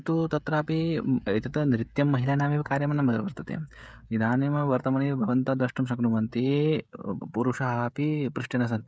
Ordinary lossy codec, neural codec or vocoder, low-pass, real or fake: none; codec, 16 kHz, 16 kbps, FreqCodec, smaller model; none; fake